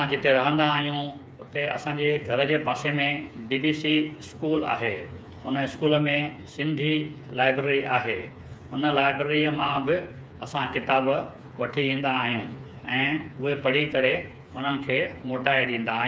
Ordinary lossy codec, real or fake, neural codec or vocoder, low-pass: none; fake; codec, 16 kHz, 4 kbps, FreqCodec, smaller model; none